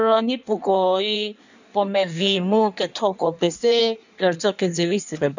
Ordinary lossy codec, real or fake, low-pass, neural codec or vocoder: none; fake; 7.2 kHz; codec, 16 kHz in and 24 kHz out, 1.1 kbps, FireRedTTS-2 codec